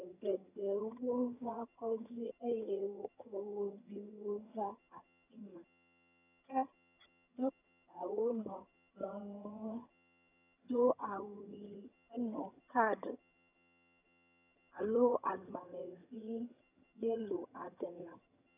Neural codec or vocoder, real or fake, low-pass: vocoder, 22.05 kHz, 80 mel bands, HiFi-GAN; fake; 3.6 kHz